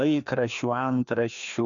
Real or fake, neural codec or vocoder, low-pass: fake; codec, 16 kHz, 2 kbps, FreqCodec, larger model; 7.2 kHz